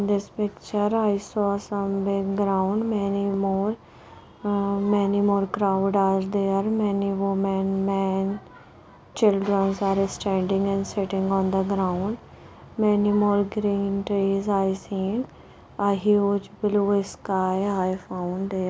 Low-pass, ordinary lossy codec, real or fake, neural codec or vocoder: none; none; real; none